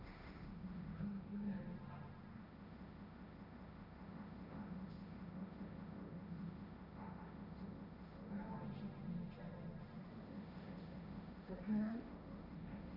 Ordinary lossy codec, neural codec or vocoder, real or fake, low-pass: none; codec, 16 kHz, 1.1 kbps, Voila-Tokenizer; fake; 5.4 kHz